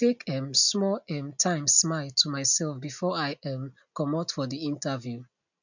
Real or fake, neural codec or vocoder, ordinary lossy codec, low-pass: real; none; none; 7.2 kHz